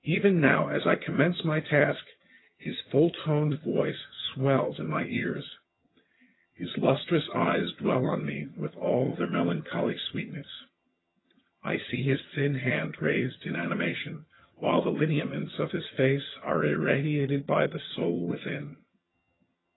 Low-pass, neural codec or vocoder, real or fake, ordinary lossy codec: 7.2 kHz; vocoder, 22.05 kHz, 80 mel bands, HiFi-GAN; fake; AAC, 16 kbps